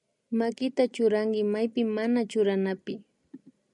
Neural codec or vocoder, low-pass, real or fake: none; 10.8 kHz; real